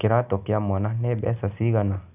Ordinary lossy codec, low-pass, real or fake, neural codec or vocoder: none; 3.6 kHz; real; none